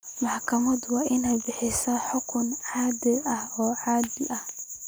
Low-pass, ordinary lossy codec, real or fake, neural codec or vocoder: none; none; real; none